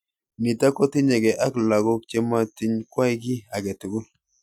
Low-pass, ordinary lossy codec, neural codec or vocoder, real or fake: 19.8 kHz; none; none; real